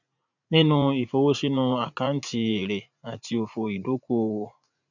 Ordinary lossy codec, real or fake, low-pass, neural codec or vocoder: none; fake; 7.2 kHz; vocoder, 44.1 kHz, 80 mel bands, Vocos